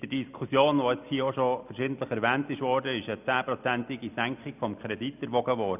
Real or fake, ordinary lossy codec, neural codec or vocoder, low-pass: real; none; none; 3.6 kHz